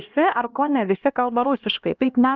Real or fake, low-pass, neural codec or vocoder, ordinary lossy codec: fake; 7.2 kHz; codec, 16 kHz, 1 kbps, X-Codec, HuBERT features, trained on LibriSpeech; Opus, 24 kbps